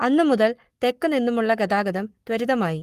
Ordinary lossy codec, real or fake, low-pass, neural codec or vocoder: Opus, 24 kbps; fake; 14.4 kHz; codec, 44.1 kHz, 7.8 kbps, Pupu-Codec